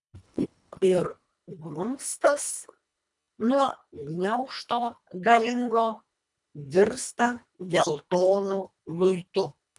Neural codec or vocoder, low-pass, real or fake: codec, 24 kHz, 1.5 kbps, HILCodec; 10.8 kHz; fake